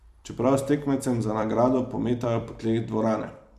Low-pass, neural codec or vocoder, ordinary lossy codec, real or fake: 14.4 kHz; vocoder, 44.1 kHz, 128 mel bands every 256 samples, BigVGAN v2; MP3, 96 kbps; fake